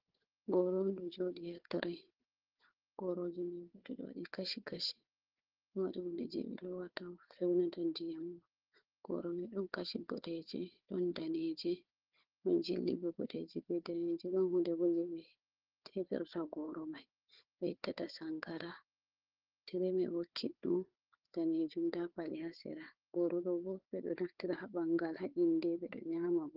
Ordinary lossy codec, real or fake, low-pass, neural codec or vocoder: Opus, 16 kbps; fake; 5.4 kHz; codec, 16 kHz, 4 kbps, FunCodec, trained on Chinese and English, 50 frames a second